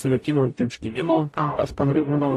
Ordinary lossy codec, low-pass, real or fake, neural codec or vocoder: AAC, 64 kbps; 14.4 kHz; fake; codec, 44.1 kHz, 0.9 kbps, DAC